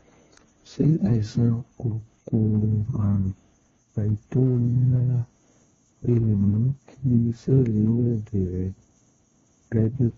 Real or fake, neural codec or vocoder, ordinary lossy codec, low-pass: fake; codec, 16 kHz, 1 kbps, FunCodec, trained on LibriTTS, 50 frames a second; AAC, 24 kbps; 7.2 kHz